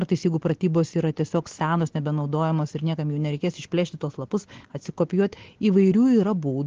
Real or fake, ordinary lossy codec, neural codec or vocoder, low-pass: real; Opus, 16 kbps; none; 7.2 kHz